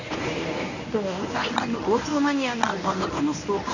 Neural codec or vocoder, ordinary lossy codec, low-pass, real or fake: codec, 24 kHz, 0.9 kbps, WavTokenizer, medium speech release version 1; none; 7.2 kHz; fake